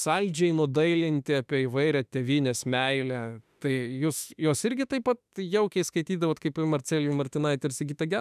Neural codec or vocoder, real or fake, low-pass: autoencoder, 48 kHz, 32 numbers a frame, DAC-VAE, trained on Japanese speech; fake; 14.4 kHz